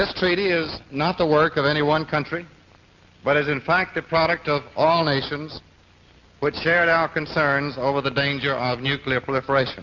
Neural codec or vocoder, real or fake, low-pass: none; real; 7.2 kHz